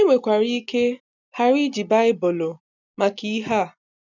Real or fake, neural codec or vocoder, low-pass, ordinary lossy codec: real; none; 7.2 kHz; none